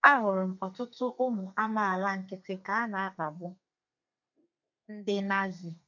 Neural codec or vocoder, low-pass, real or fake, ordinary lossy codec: codec, 32 kHz, 1.9 kbps, SNAC; 7.2 kHz; fake; none